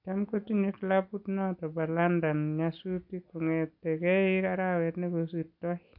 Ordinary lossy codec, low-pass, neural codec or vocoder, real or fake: AAC, 48 kbps; 5.4 kHz; none; real